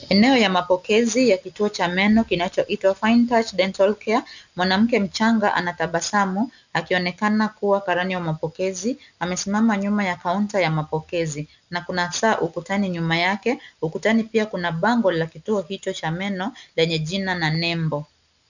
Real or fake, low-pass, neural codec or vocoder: real; 7.2 kHz; none